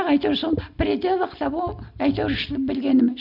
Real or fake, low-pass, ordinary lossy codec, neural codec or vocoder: real; 5.4 kHz; AAC, 48 kbps; none